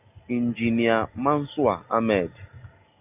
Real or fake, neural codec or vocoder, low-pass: real; none; 3.6 kHz